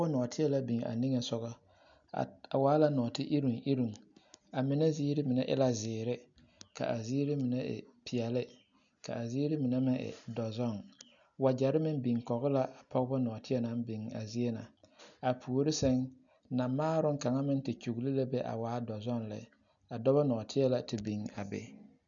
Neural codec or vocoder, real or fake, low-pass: none; real; 7.2 kHz